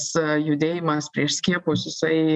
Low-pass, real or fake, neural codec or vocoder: 10.8 kHz; real; none